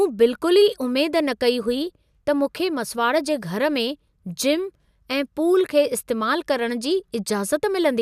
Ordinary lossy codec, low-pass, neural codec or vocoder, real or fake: none; 14.4 kHz; none; real